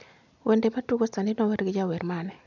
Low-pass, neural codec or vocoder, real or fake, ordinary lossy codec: 7.2 kHz; none; real; none